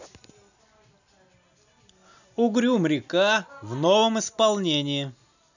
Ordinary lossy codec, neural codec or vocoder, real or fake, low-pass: none; none; real; 7.2 kHz